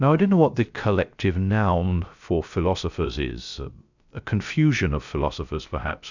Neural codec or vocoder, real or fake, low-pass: codec, 16 kHz, 0.3 kbps, FocalCodec; fake; 7.2 kHz